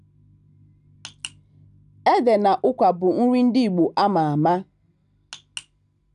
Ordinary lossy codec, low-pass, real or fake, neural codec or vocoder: none; 10.8 kHz; real; none